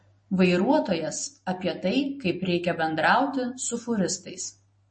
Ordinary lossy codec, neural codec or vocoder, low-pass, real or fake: MP3, 32 kbps; none; 9.9 kHz; real